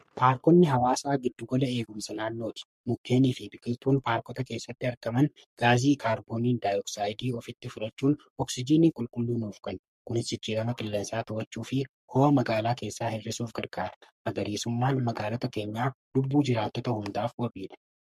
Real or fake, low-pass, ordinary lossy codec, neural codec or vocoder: fake; 14.4 kHz; MP3, 64 kbps; codec, 44.1 kHz, 3.4 kbps, Pupu-Codec